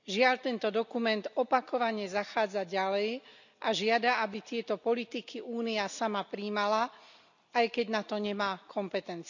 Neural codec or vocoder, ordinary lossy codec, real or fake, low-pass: none; none; real; 7.2 kHz